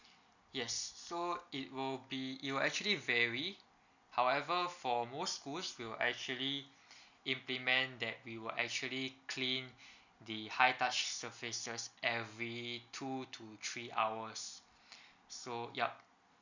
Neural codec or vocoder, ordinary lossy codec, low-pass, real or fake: none; none; 7.2 kHz; real